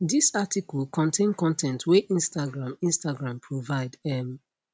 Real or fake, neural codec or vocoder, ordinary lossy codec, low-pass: real; none; none; none